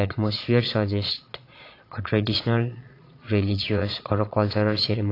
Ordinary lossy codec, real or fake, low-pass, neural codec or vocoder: AAC, 32 kbps; fake; 5.4 kHz; vocoder, 22.05 kHz, 80 mel bands, Vocos